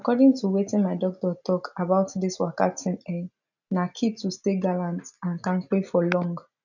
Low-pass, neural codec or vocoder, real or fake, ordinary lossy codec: 7.2 kHz; none; real; none